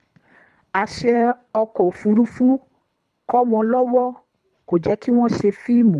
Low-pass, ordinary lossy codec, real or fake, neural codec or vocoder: none; none; fake; codec, 24 kHz, 3 kbps, HILCodec